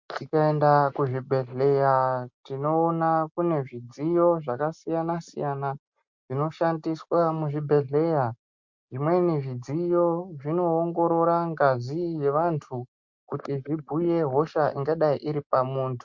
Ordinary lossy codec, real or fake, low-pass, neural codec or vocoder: MP3, 48 kbps; real; 7.2 kHz; none